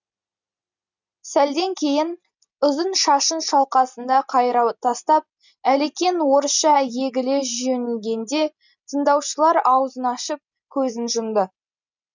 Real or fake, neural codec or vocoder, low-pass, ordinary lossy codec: real; none; 7.2 kHz; none